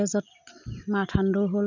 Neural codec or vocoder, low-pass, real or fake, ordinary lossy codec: none; 7.2 kHz; real; none